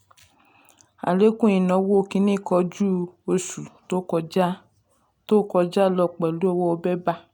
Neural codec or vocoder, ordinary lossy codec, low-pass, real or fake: none; none; 19.8 kHz; real